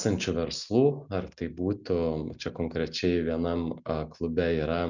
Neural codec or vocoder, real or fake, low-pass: none; real; 7.2 kHz